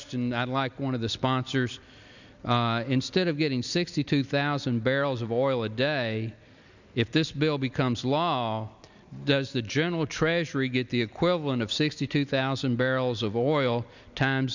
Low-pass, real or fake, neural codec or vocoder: 7.2 kHz; real; none